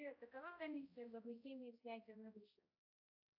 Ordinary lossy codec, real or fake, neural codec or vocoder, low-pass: AAC, 32 kbps; fake; codec, 16 kHz, 0.5 kbps, X-Codec, HuBERT features, trained on balanced general audio; 5.4 kHz